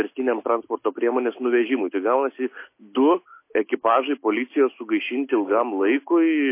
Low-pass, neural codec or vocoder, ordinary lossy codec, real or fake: 3.6 kHz; none; MP3, 24 kbps; real